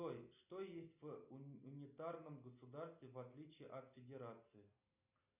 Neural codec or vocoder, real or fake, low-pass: none; real; 3.6 kHz